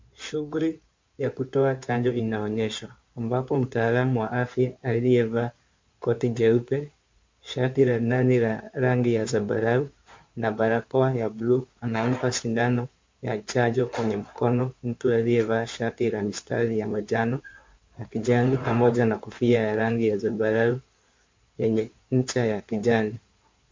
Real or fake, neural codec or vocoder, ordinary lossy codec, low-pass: fake; codec, 16 kHz in and 24 kHz out, 2.2 kbps, FireRedTTS-2 codec; MP3, 48 kbps; 7.2 kHz